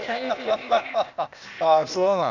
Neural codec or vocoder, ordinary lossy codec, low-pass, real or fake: codec, 16 kHz, 0.8 kbps, ZipCodec; none; 7.2 kHz; fake